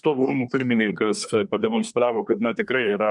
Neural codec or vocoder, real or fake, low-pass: codec, 24 kHz, 1 kbps, SNAC; fake; 10.8 kHz